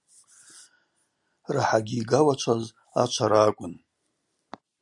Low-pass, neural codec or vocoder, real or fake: 10.8 kHz; none; real